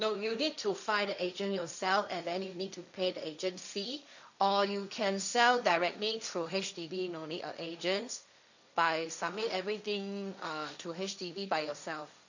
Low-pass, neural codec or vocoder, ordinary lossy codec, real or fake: 7.2 kHz; codec, 16 kHz, 1.1 kbps, Voila-Tokenizer; none; fake